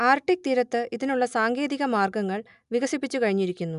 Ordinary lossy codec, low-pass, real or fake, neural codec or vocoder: none; 10.8 kHz; real; none